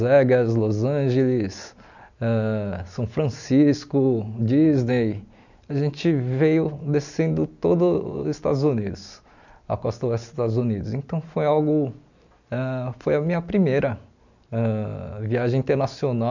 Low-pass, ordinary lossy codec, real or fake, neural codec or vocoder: 7.2 kHz; none; real; none